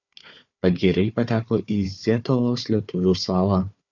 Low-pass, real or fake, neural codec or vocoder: 7.2 kHz; fake; codec, 16 kHz, 4 kbps, FunCodec, trained on Chinese and English, 50 frames a second